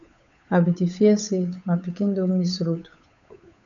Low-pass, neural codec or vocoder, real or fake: 7.2 kHz; codec, 16 kHz, 8 kbps, FunCodec, trained on Chinese and English, 25 frames a second; fake